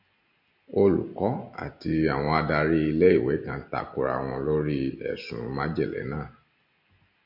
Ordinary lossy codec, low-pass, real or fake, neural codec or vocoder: MP3, 48 kbps; 5.4 kHz; real; none